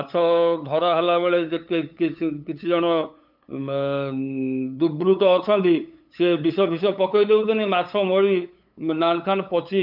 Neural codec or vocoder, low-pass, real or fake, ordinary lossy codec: codec, 16 kHz, 8 kbps, FunCodec, trained on LibriTTS, 25 frames a second; 5.4 kHz; fake; none